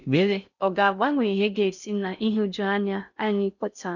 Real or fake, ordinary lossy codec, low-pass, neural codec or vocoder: fake; none; 7.2 kHz; codec, 16 kHz in and 24 kHz out, 0.6 kbps, FocalCodec, streaming, 4096 codes